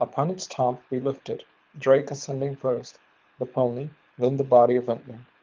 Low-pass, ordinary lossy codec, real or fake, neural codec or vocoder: 7.2 kHz; Opus, 24 kbps; fake; codec, 24 kHz, 6 kbps, HILCodec